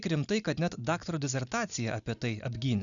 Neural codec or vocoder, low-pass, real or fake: none; 7.2 kHz; real